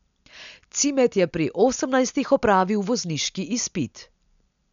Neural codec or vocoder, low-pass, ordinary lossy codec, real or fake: none; 7.2 kHz; none; real